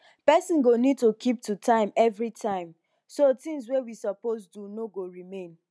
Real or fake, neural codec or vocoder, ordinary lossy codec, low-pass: real; none; none; none